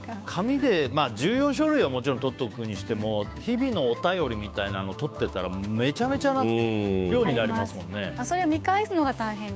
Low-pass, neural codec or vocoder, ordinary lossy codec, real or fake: none; codec, 16 kHz, 6 kbps, DAC; none; fake